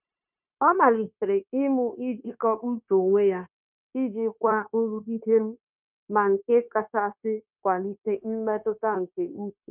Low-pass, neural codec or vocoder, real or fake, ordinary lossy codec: 3.6 kHz; codec, 16 kHz, 0.9 kbps, LongCat-Audio-Codec; fake; none